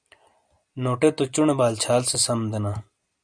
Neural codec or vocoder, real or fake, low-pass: none; real; 9.9 kHz